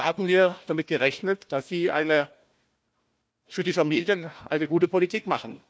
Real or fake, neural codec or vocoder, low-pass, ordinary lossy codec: fake; codec, 16 kHz, 1 kbps, FunCodec, trained on Chinese and English, 50 frames a second; none; none